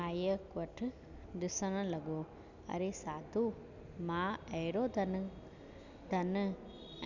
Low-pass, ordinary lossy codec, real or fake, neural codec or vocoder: 7.2 kHz; none; real; none